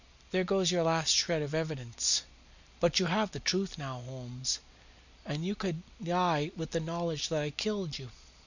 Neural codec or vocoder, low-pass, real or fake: none; 7.2 kHz; real